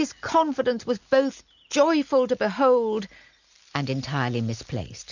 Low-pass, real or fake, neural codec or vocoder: 7.2 kHz; real; none